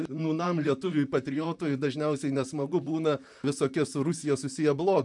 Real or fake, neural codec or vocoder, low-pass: fake; vocoder, 44.1 kHz, 128 mel bands, Pupu-Vocoder; 10.8 kHz